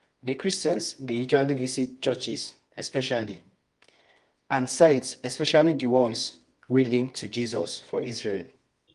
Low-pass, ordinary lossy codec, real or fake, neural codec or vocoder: 10.8 kHz; Opus, 32 kbps; fake; codec, 24 kHz, 0.9 kbps, WavTokenizer, medium music audio release